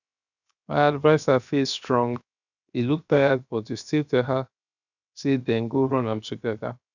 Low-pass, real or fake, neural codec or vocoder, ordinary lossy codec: 7.2 kHz; fake; codec, 16 kHz, 0.7 kbps, FocalCodec; none